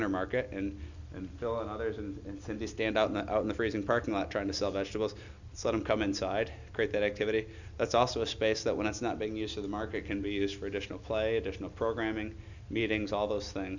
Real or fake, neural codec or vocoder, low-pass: real; none; 7.2 kHz